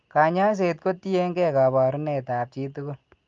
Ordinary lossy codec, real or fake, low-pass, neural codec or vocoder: Opus, 32 kbps; real; 7.2 kHz; none